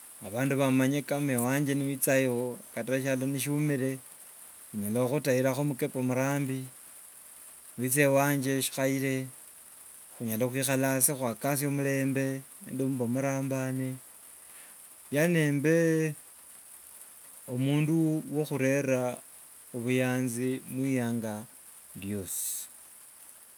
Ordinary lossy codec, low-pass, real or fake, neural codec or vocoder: none; none; real; none